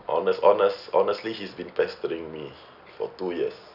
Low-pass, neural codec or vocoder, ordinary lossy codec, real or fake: 5.4 kHz; none; none; real